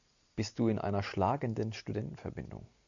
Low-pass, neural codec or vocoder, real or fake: 7.2 kHz; none; real